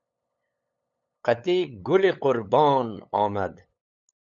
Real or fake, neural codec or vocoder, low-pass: fake; codec, 16 kHz, 8 kbps, FunCodec, trained on LibriTTS, 25 frames a second; 7.2 kHz